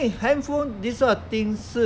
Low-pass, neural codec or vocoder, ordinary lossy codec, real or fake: none; none; none; real